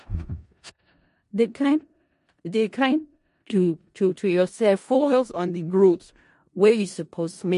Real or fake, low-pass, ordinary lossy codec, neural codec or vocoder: fake; 10.8 kHz; MP3, 48 kbps; codec, 16 kHz in and 24 kHz out, 0.4 kbps, LongCat-Audio-Codec, four codebook decoder